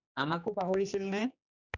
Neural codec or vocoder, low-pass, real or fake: codec, 16 kHz, 2 kbps, X-Codec, HuBERT features, trained on general audio; 7.2 kHz; fake